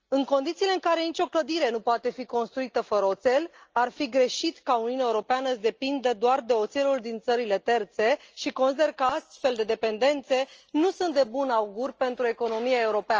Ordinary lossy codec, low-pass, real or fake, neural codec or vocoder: Opus, 24 kbps; 7.2 kHz; real; none